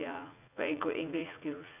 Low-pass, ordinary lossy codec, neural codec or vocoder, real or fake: 3.6 kHz; none; vocoder, 44.1 kHz, 80 mel bands, Vocos; fake